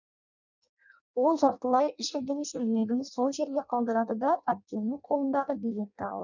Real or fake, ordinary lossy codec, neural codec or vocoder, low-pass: fake; none; codec, 16 kHz in and 24 kHz out, 0.6 kbps, FireRedTTS-2 codec; 7.2 kHz